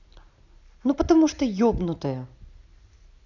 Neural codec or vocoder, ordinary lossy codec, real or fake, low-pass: none; none; real; 7.2 kHz